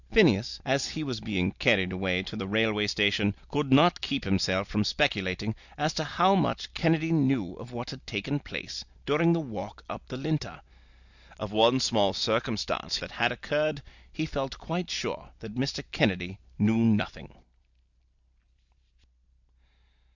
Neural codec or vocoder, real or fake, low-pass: none; real; 7.2 kHz